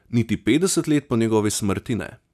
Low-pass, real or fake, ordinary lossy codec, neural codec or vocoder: 14.4 kHz; real; none; none